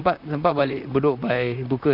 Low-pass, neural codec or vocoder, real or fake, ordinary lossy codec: 5.4 kHz; vocoder, 44.1 kHz, 128 mel bands, Pupu-Vocoder; fake; none